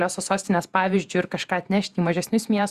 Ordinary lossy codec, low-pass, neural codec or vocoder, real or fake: Opus, 64 kbps; 14.4 kHz; vocoder, 48 kHz, 128 mel bands, Vocos; fake